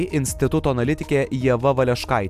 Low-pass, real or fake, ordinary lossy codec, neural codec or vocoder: 14.4 kHz; real; AAC, 96 kbps; none